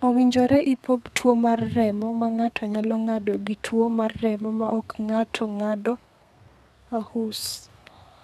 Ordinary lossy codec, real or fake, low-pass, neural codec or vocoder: none; fake; 14.4 kHz; codec, 32 kHz, 1.9 kbps, SNAC